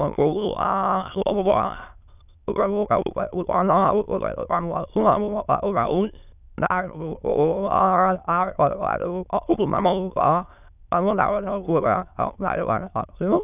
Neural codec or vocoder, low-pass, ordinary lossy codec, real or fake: autoencoder, 22.05 kHz, a latent of 192 numbers a frame, VITS, trained on many speakers; 3.6 kHz; none; fake